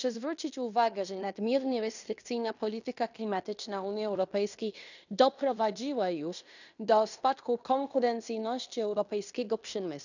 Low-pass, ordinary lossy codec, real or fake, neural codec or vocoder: 7.2 kHz; none; fake; codec, 16 kHz in and 24 kHz out, 0.9 kbps, LongCat-Audio-Codec, fine tuned four codebook decoder